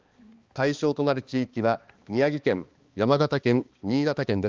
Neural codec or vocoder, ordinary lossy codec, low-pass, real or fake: codec, 16 kHz, 2 kbps, X-Codec, HuBERT features, trained on balanced general audio; Opus, 32 kbps; 7.2 kHz; fake